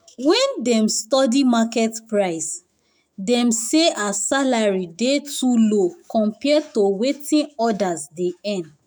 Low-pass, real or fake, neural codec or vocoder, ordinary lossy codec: none; fake; autoencoder, 48 kHz, 128 numbers a frame, DAC-VAE, trained on Japanese speech; none